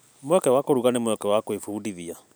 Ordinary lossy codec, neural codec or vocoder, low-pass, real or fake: none; none; none; real